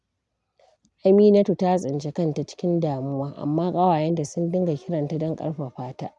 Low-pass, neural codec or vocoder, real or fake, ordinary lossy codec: 10.8 kHz; none; real; none